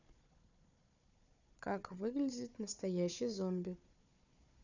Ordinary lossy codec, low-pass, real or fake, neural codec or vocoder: none; 7.2 kHz; fake; codec, 16 kHz, 4 kbps, FunCodec, trained on Chinese and English, 50 frames a second